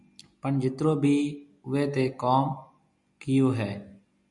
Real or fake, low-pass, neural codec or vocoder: real; 10.8 kHz; none